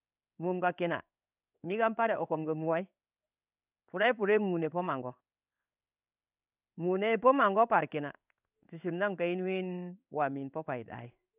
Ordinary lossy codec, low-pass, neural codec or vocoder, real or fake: none; 3.6 kHz; codec, 16 kHz in and 24 kHz out, 1 kbps, XY-Tokenizer; fake